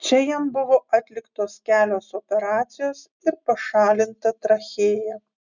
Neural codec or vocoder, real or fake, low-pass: none; real; 7.2 kHz